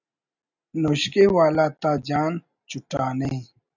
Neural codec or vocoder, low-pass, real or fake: none; 7.2 kHz; real